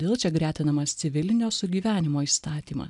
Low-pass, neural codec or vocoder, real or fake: 10.8 kHz; none; real